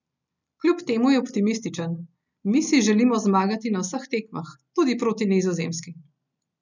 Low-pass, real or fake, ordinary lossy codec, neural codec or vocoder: 7.2 kHz; real; none; none